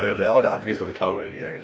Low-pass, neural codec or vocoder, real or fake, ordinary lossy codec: none; codec, 16 kHz, 1 kbps, FreqCodec, larger model; fake; none